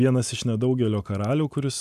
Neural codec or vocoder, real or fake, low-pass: none; real; 14.4 kHz